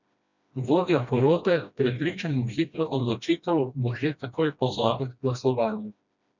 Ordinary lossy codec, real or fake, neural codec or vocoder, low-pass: none; fake; codec, 16 kHz, 1 kbps, FreqCodec, smaller model; 7.2 kHz